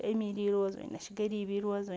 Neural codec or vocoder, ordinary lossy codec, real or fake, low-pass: none; none; real; none